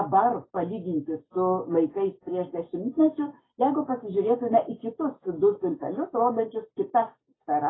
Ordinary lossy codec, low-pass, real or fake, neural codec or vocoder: AAC, 16 kbps; 7.2 kHz; real; none